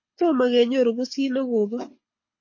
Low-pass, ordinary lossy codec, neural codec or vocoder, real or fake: 7.2 kHz; MP3, 32 kbps; codec, 24 kHz, 6 kbps, HILCodec; fake